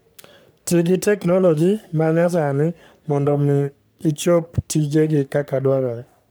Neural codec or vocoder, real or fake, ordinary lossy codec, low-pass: codec, 44.1 kHz, 3.4 kbps, Pupu-Codec; fake; none; none